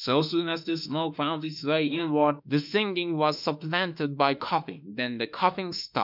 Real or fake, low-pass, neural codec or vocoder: fake; 5.4 kHz; autoencoder, 48 kHz, 32 numbers a frame, DAC-VAE, trained on Japanese speech